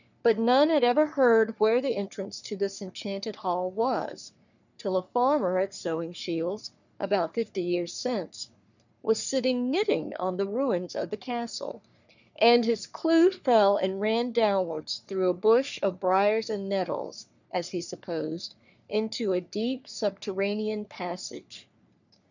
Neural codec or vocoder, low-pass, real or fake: codec, 44.1 kHz, 3.4 kbps, Pupu-Codec; 7.2 kHz; fake